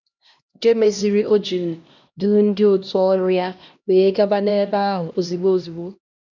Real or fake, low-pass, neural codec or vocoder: fake; 7.2 kHz; codec, 16 kHz, 1 kbps, X-Codec, HuBERT features, trained on LibriSpeech